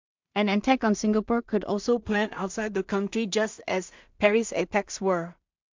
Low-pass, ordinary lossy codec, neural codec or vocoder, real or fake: 7.2 kHz; MP3, 64 kbps; codec, 16 kHz in and 24 kHz out, 0.4 kbps, LongCat-Audio-Codec, two codebook decoder; fake